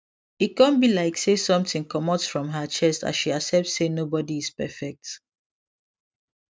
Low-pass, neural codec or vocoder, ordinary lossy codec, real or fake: none; none; none; real